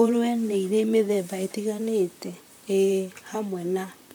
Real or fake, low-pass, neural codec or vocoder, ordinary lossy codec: fake; none; vocoder, 44.1 kHz, 128 mel bands, Pupu-Vocoder; none